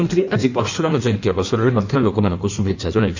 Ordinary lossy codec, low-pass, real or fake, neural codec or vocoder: none; 7.2 kHz; fake; codec, 16 kHz in and 24 kHz out, 1.1 kbps, FireRedTTS-2 codec